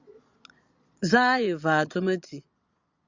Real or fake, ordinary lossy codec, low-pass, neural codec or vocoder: fake; Opus, 64 kbps; 7.2 kHz; vocoder, 22.05 kHz, 80 mel bands, Vocos